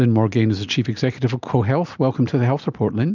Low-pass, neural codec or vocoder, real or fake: 7.2 kHz; none; real